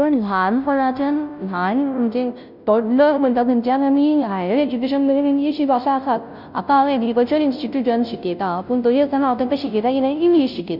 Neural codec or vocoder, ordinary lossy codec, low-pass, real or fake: codec, 16 kHz, 0.5 kbps, FunCodec, trained on Chinese and English, 25 frames a second; none; 5.4 kHz; fake